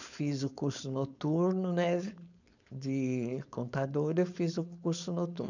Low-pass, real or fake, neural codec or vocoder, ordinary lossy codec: 7.2 kHz; fake; codec, 16 kHz, 4.8 kbps, FACodec; none